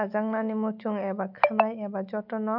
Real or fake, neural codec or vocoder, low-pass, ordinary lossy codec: real; none; 5.4 kHz; none